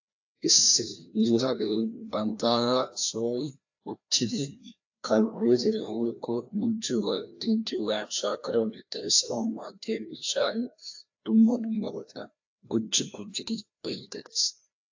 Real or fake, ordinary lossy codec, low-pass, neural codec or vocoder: fake; AAC, 48 kbps; 7.2 kHz; codec, 16 kHz, 1 kbps, FreqCodec, larger model